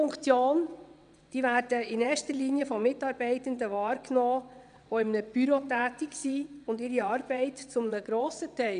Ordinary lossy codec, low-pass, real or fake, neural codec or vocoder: none; 9.9 kHz; fake; vocoder, 22.05 kHz, 80 mel bands, WaveNeXt